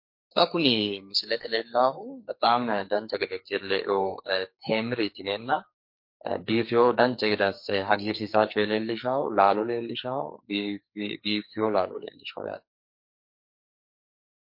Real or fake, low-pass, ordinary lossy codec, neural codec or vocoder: fake; 5.4 kHz; MP3, 32 kbps; codec, 44.1 kHz, 2.6 kbps, SNAC